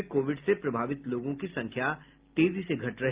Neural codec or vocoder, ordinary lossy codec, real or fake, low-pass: none; Opus, 32 kbps; real; 3.6 kHz